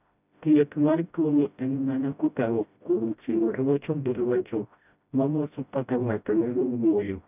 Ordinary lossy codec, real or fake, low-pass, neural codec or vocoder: none; fake; 3.6 kHz; codec, 16 kHz, 0.5 kbps, FreqCodec, smaller model